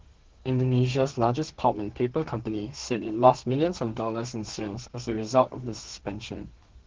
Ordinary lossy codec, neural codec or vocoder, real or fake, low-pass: Opus, 16 kbps; codec, 32 kHz, 1.9 kbps, SNAC; fake; 7.2 kHz